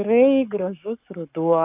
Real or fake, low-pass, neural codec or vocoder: fake; 3.6 kHz; codec, 44.1 kHz, 7.8 kbps, Pupu-Codec